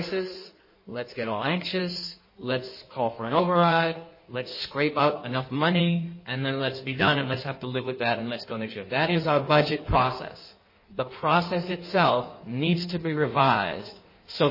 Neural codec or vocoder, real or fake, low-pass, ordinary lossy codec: codec, 16 kHz in and 24 kHz out, 1.1 kbps, FireRedTTS-2 codec; fake; 5.4 kHz; MP3, 24 kbps